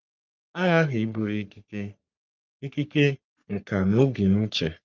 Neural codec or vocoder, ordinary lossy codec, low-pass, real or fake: codec, 44.1 kHz, 3.4 kbps, Pupu-Codec; Opus, 24 kbps; 7.2 kHz; fake